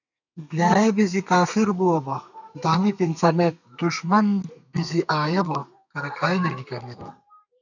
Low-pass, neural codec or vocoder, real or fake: 7.2 kHz; codec, 32 kHz, 1.9 kbps, SNAC; fake